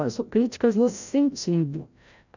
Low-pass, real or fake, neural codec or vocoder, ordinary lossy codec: 7.2 kHz; fake; codec, 16 kHz, 0.5 kbps, FreqCodec, larger model; none